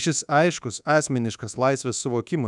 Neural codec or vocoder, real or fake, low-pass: codec, 24 kHz, 1.2 kbps, DualCodec; fake; 10.8 kHz